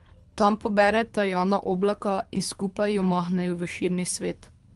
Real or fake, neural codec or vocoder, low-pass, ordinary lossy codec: fake; codec, 24 kHz, 3 kbps, HILCodec; 10.8 kHz; Opus, 32 kbps